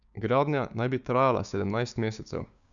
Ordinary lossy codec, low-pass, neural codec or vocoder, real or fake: none; 7.2 kHz; codec, 16 kHz, 6 kbps, DAC; fake